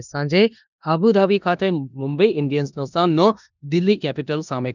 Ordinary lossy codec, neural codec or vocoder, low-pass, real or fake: none; codec, 16 kHz in and 24 kHz out, 0.9 kbps, LongCat-Audio-Codec, four codebook decoder; 7.2 kHz; fake